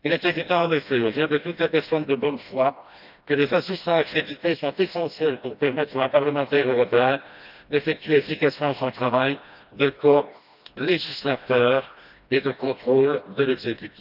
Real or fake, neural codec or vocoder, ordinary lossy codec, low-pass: fake; codec, 16 kHz, 1 kbps, FreqCodec, smaller model; none; 5.4 kHz